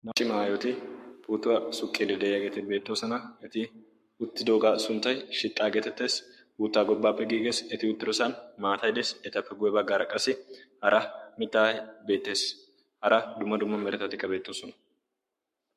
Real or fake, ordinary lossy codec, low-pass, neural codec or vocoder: fake; MP3, 64 kbps; 14.4 kHz; codec, 44.1 kHz, 7.8 kbps, Pupu-Codec